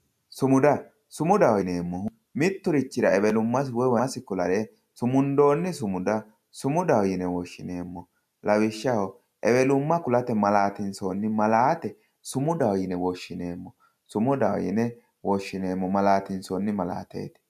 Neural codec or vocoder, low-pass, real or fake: none; 14.4 kHz; real